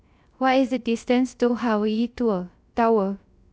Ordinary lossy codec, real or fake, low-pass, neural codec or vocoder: none; fake; none; codec, 16 kHz, 0.3 kbps, FocalCodec